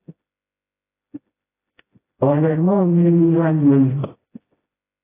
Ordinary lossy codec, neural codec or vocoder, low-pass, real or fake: AAC, 16 kbps; codec, 16 kHz, 0.5 kbps, FreqCodec, smaller model; 3.6 kHz; fake